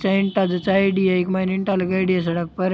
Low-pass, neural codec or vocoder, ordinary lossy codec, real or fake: none; none; none; real